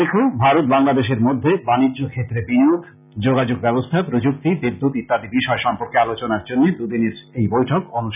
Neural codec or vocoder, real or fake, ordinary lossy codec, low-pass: none; real; none; 3.6 kHz